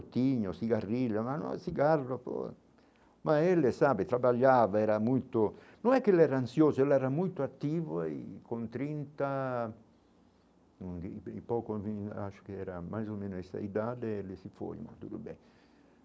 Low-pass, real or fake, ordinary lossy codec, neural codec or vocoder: none; real; none; none